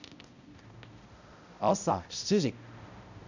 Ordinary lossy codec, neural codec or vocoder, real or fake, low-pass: none; codec, 16 kHz, 0.5 kbps, X-Codec, HuBERT features, trained on balanced general audio; fake; 7.2 kHz